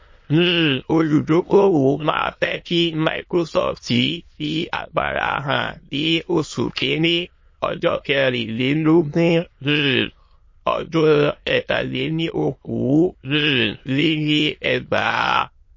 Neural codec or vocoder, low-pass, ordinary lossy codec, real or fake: autoencoder, 22.05 kHz, a latent of 192 numbers a frame, VITS, trained on many speakers; 7.2 kHz; MP3, 32 kbps; fake